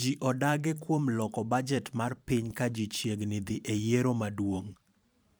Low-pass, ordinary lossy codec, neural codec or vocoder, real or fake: none; none; vocoder, 44.1 kHz, 128 mel bands every 512 samples, BigVGAN v2; fake